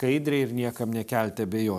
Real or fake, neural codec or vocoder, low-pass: real; none; 14.4 kHz